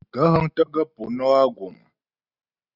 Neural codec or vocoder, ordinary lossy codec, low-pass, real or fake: none; Opus, 64 kbps; 5.4 kHz; real